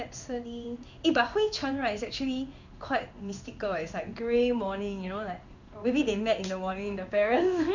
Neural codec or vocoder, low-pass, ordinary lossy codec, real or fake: codec, 16 kHz in and 24 kHz out, 1 kbps, XY-Tokenizer; 7.2 kHz; none; fake